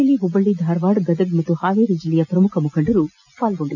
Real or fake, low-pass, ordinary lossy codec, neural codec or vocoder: real; 7.2 kHz; none; none